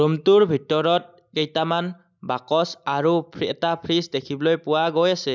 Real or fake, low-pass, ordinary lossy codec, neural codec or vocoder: real; 7.2 kHz; none; none